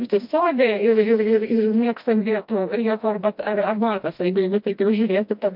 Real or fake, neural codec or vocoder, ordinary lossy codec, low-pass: fake; codec, 16 kHz, 1 kbps, FreqCodec, smaller model; MP3, 48 kbps; 5.4 kHz